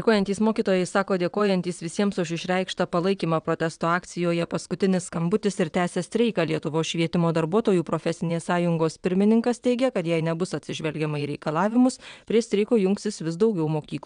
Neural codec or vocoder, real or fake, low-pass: vocoder, 22.05 kHz, 80 mel bands, WaveNeXt; fake; 9.9 kHz